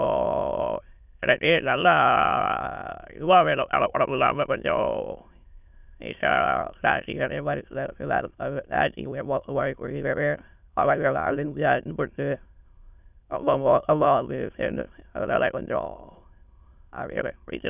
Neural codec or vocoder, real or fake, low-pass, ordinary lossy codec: autoencoder, 22.05 kHz, a latent of 192 numbers a frame, VITS, trained on many speakers; fake; 3.6 kHz; none